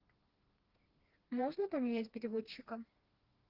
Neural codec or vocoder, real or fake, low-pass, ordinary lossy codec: codec, 16 kHz, 2 kbps, FreqCodec, smaller model; fake; 5.4 kHz; Opus, 16 kbps